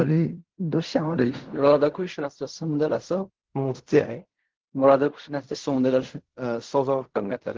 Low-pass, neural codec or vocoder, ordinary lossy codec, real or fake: 7.2 kHz; codec, 16 kHz in and 24 kHz out, 0.4 kbps, LongCat-Audio-Codec, fine tuned four codebook decoder; Opus, 16 kbps; fake